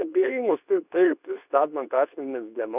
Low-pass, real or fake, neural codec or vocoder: 3.6 kHz; fake; codec, 24 kHz, 0.9 kbps, WavTokenizer, medium speech release version 2